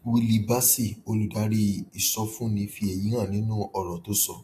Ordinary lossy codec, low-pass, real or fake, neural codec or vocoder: AAC, 64 kbps; 14.4 kHz; real; none